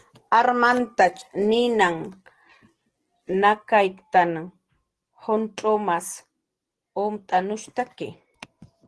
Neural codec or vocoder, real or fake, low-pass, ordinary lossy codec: none; real; 10.8 kHz; Opus, 16 kbps